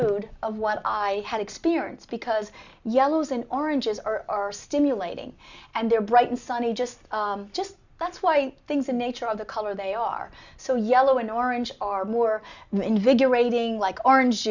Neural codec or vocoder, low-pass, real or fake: none; 7.2 kHz; real